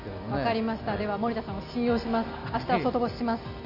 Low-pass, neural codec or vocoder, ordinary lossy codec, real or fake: 5.4 kHz; none; none; real